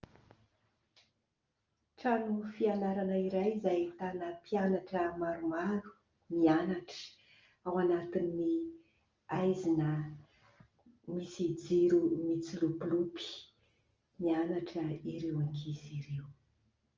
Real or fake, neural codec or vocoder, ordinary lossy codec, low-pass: real; none; Opus, 24 kbps; 7.2 kHz